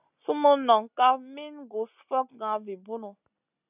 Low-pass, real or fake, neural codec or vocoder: 3.6 kHz; real; none